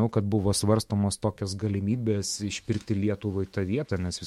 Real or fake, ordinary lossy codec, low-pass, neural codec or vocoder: fake; MP3, 64 kbps; 19.8 kHz; autoencoder, 48 kHz, 128 numbers a frame, DAC-VAE, trained on Japanese speech